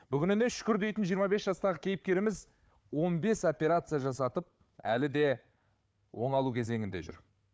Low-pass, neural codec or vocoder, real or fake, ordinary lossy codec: none; codec, 16 kHz, 4 kbps, FunCodec, trained on Chinese and English, 50 frames a second; fake; none